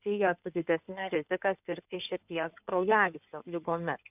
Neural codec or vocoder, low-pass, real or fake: codec, 16 kHz in and 24 kHz out, 2.2 kbps, FireRedTTS-2 codec; 3.6 kHz; fake